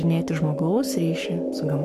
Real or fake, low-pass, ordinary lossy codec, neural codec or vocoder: fake; 14.4 kHz; MP3, 96 kbps; codec, 44.1 kHz, 7.8 kbps, Pupu-Codec